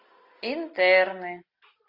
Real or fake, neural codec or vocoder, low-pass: real; none; 5.4 kHz